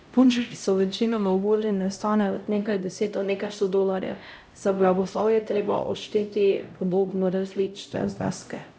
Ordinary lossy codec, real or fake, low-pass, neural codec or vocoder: none; fake; none; codec, 16 kHz, 0.5 kbps, X-Codec, HuBERT features, trained on LibriSpeech